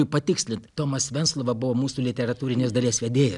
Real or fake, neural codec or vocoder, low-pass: real; none; 10.8 kHz